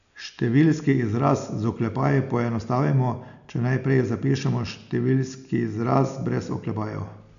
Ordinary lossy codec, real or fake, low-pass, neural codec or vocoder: none; real; 7.2 kHz; none